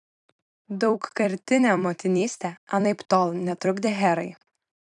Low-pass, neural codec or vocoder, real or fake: 10.8 kHz; vocoder, 44.1 kHz, 128 mel bands every 256 samples, BigVGAN v2; fake